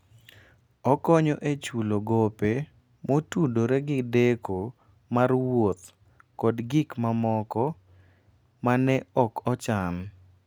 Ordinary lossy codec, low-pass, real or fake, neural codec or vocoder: none; none; real; none